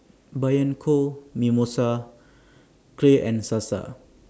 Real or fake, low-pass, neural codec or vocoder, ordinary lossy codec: real; none; none; none